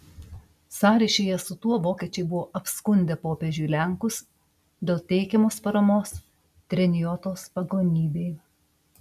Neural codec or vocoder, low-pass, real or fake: none; 14.4 kHz; real